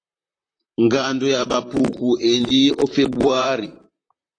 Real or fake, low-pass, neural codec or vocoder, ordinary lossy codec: fake; 9.9 kHz; vocoder, 44.1 kHz, 128 mel bands, Pupu-Vocoder; AAC, 32 kbps